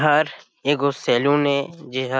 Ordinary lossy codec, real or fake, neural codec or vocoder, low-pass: none; real; none; none